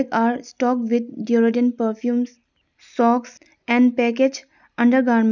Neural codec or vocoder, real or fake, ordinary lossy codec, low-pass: none; real; none; 7.2 kHz